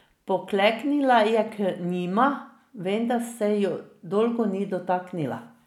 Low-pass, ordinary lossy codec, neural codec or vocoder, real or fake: 19.8 kHz; none; none; real